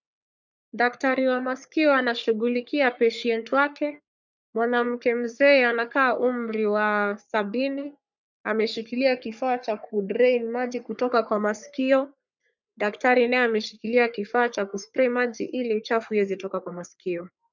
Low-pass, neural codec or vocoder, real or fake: 7.2 kHz; codec, 44.1 kHz, 3.4 kbps, Pupu-Codec; fake